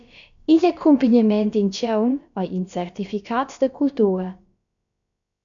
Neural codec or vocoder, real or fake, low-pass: codec, 16 kHz, about 1 kbps, DyCAST, with the encoder's durations; fake; 7.2 kHz